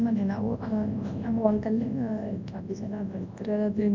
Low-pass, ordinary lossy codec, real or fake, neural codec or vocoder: 7.2 kHz; MP3, 64 kbps; fake; codec, 24 kHz, 0.9 kbps, WavTokenizer, large speech release